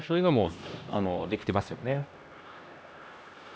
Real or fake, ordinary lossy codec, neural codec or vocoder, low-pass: fake; none; codec, 16 kHz, 1 kbps, X-Codec, HuBERT features, trained on LibriSpeech; none